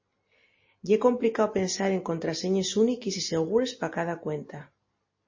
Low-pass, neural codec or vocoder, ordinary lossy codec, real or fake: 7.2 kHz; none; MP3, 32 kbps; real